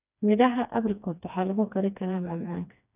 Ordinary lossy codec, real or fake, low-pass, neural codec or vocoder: none; fake; 3.6 kHz; codec, 16 kHz, 2 kbps, FreqCodec, smaller model